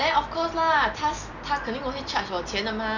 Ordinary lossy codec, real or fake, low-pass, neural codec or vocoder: none; real; 7.2 kHz; none